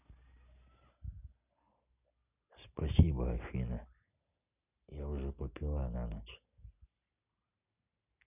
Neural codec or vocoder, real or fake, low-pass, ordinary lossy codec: none; real; 3.6 kHz; none